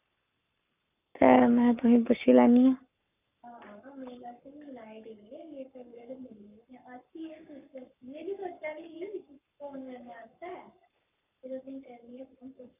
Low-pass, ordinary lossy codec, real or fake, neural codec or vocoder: 3.6 kHz; none; fake; codec, 44.1 kHz, 7.8 kbps, Pupu-Codec